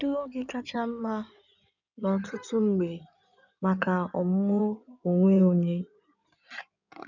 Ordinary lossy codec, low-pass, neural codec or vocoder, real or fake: none; 7.2 kHz; codec, 16 kHz in and 24 kHz out, 2.2 kbps, FireRedTTS-2 codec; fake